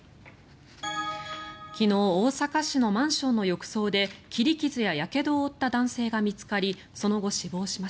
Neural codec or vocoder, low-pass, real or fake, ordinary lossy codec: none; none; real; none